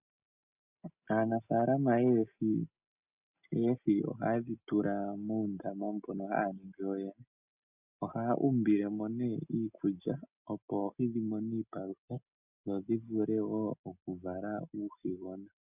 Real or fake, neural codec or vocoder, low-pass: real; none; 3.6 kHz